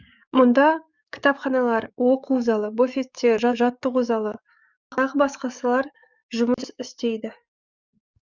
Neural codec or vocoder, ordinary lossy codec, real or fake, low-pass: codec, 44.1 kHz, 7.8 kbps, DAC; none; fake; 7.2 kHz